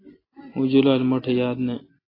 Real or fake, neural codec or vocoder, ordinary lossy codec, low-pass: real; none; AAC, 24 kbps; 5.4 kHz